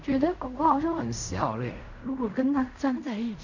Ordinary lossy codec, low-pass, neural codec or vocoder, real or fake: none; 7.2 kHz; codec, 16 kHz in and 24 kHz out, 0.4 kbps, LongCat-Audio-Codec, fine tuned four codebook decoder; fake